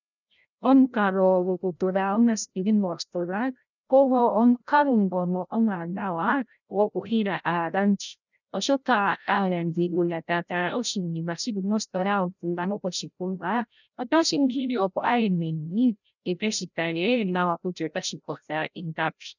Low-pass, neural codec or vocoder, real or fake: 7.2 kHz; codec, 16 kHz, 0.5 kbps, FreqCodec, larger model; fake